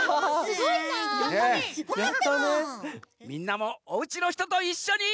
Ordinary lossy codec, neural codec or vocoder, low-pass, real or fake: none; none; none; real